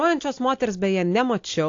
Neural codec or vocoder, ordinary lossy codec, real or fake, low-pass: none; AAC, 48 kbps; real; 7.2 kHz